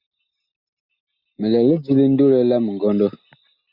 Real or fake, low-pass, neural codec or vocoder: real; 5.4 kHz; none